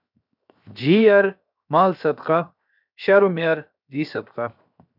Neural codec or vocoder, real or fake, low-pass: codec, 16 kHz, 0.7 kbps, FocalCodec; fake; 5.4 kHz